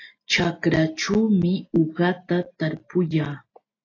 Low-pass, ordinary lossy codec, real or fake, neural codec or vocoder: 7.2 kHz; AAC, 32 kbps; real; none